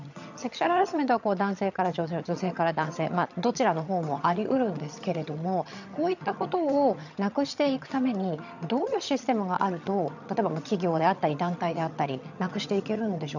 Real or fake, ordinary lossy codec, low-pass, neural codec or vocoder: fake; none; 7.2 kHz; vocoder, 22.05 kHz, 80 mel bands, HiFi-GAN